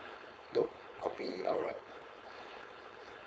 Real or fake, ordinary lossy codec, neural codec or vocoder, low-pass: fake; none; codec, 16 kHz, 4.8 kbps, FACodec; none